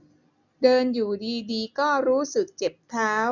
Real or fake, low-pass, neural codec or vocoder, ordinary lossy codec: real; 7.2 kHz; none; none